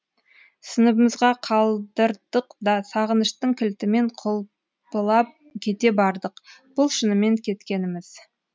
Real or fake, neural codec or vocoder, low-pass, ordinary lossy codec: real; none; none; none